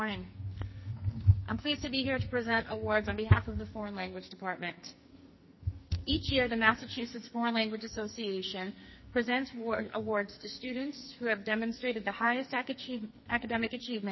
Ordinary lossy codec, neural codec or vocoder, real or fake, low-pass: MP3, 24 kbps; codec, 44.1 kHz, 2.6 kbps, SNAC; fake; 7.2 kHz